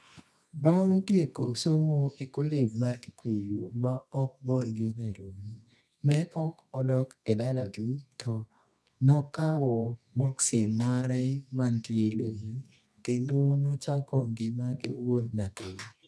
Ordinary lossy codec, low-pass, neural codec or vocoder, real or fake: none; none; codec, 24 kHz, 0.9 kbps, WavTokenizer, medium music audio release; fake